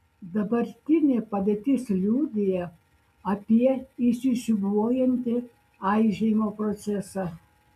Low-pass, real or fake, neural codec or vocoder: 14.4 kHz; real; none